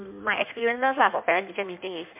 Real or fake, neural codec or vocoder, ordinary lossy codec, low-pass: fake; codec, 16 kHz in and 24 kHz out, 1.1 kbps, FireRedTTS-2 codec; MP3, 32 kbps; 3.6 kHz